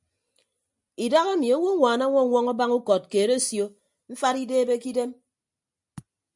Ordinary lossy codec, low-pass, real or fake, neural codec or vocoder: MP3, 96 kbps; 10.8 kHz; real; none